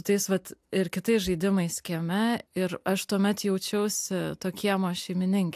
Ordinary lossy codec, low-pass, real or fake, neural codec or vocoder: AAC, 96 kbps; 14.4 kHz; real; none